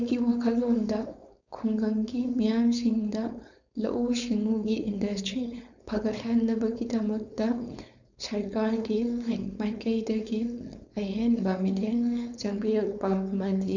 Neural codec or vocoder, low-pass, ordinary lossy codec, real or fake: codec, 16 kHz, 4.8 kbps, FACodec; 7.2 kHz; none; fake